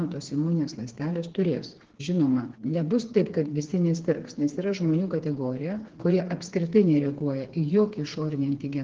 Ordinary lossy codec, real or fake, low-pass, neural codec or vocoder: Opus, 16 kbps; fake; 7.2 kHz; codec, 16 kHz, 4 kbps, FreqCodec, smaller model